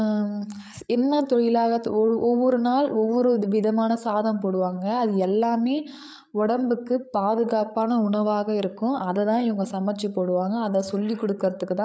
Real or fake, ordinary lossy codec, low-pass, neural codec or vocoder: fake; none; none; codec, 16 kHz, 8 kbps, FreqCodec, larger model